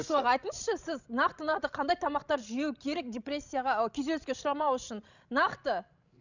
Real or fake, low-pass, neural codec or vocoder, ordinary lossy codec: fake; 7.2 kHz; codec, 16 kHz, 16 kbps, FreqCodec, larger model; none